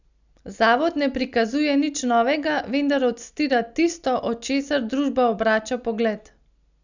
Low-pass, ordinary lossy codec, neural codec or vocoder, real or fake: 7.2 kHz; none; none; real